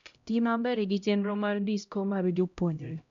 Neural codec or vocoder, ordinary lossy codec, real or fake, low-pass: codec, 16 kHz, 0.5 kbps, X-Codec, HuBERT features, trained on LibriSpeech; none; fake; 7.2 kHz